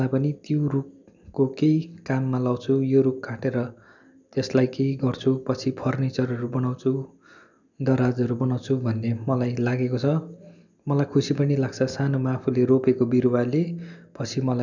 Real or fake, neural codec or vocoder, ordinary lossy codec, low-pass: real; none; none; 7.2 kHz